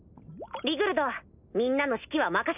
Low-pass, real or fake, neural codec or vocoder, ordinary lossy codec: 3.6 kHz; real; none; none